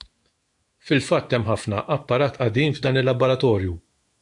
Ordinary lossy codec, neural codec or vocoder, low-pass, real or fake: AAC, 64 kbps; autoencoder, 48 kHz, 128 numbers a frame, DAC-VAE, trained on Japanese speech; 10.8 kHz; fake